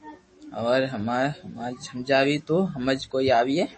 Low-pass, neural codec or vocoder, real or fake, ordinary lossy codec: 10.8 kHz; autoencoder, 48 kHz, 128 numbers a frame, DAC-VAE, trained on Japanese speech; fake; MP3, 32 kbps